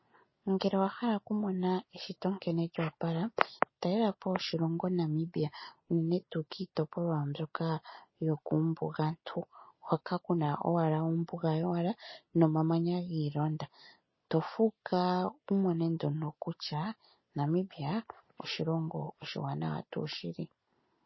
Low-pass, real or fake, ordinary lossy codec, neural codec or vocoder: 7.2 kHz; real; MP3, 24 kbps; none